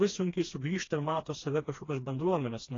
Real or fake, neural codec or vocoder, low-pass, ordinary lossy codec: fake; codec, 16 kHz, 2 kbps, FreqCodec, smaller model; 7.2 kHz; AAC, 32 kbps